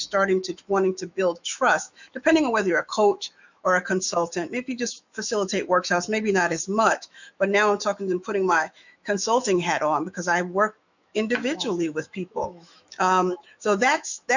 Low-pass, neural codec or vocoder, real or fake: 7.2 kHz; autoencoder, 48 kHz, 128 numbers a frame, DAC-VAE, trained on Japanese speech; fake